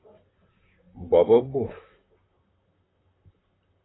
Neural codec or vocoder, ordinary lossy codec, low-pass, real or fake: vocoder, 44.1 kHz, 128 mel bands, Pupu-Vocoder; AAC, 16 kbps; 7.2 kHz; fake